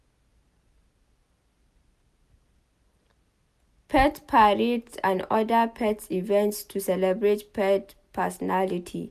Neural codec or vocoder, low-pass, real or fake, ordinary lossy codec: none; 14.4 kHz; real; none